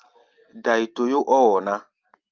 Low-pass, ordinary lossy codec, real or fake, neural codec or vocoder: 7.2 kHz; Opus, 32 kbps; real; none